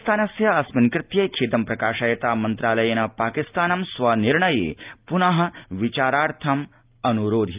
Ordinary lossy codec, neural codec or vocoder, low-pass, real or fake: Opus, 24 kbps; none; 3.6 kHz; real